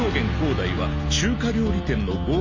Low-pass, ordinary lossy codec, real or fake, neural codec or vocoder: 7.2 kHz; MP3, 32 kbps; real; none